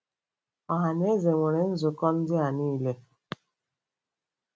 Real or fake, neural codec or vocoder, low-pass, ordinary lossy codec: real; none; none; none